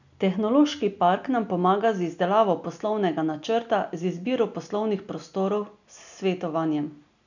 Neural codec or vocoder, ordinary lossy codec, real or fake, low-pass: none; none; real; 7.2 kHz